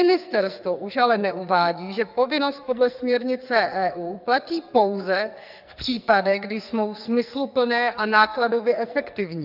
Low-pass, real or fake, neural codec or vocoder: 5.4 kHz; fake; codec, 44.1 kHz, 2.6 kbps, SNAC